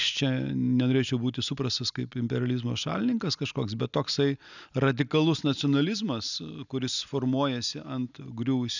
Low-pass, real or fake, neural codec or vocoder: 7.2 kHz; real; none